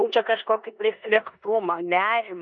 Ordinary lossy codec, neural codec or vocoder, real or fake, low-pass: MP3, 48 kbps; codec, 16 kHz in and 24 kHz out, 0.9 kbps, LongCat-Audio-Codec, four codebook decoder; fake; 9.9 kHz